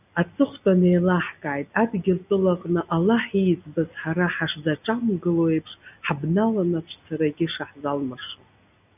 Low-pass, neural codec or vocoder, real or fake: 3.6 kHz; none; real